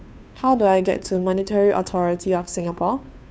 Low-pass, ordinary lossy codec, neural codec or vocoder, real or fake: none; none; codec, 16 kHz, 2 kbps, FunCodec, trained on Chinese and English, 25 frames a second; fake